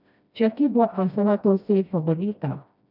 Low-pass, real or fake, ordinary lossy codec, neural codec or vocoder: 5.4 kHz; fake; none; codec, 16 kHz, 1 kbps, FreqCodec, smaller model